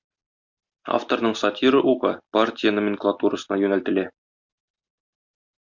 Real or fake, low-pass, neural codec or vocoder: real; 7.2 kHz; none